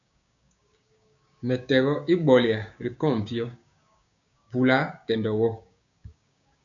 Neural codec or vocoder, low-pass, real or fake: codec, 16 kHz, 6 kbps, DAC; 7.2 kHz; fake